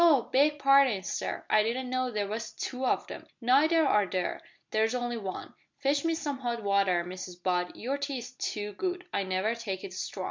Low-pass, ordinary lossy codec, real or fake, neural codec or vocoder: 7.2 kHz; MP3, 64 kbps; real; none